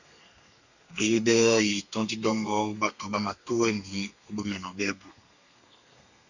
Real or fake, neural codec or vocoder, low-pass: fake; codec, 32 kHz, 1.9 kbps, SNAC; 7.2 kHz